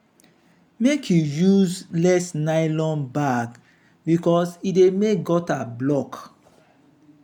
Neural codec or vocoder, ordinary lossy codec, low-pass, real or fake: none; none; 19.8 kHz; real